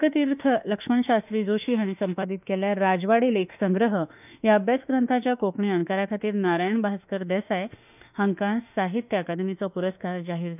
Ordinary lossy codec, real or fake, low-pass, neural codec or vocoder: none; fake; 3.6 kHz; autoencoder, 48 kHz, 32 numbers a frame, DAC-VAE, trained on Japanese speech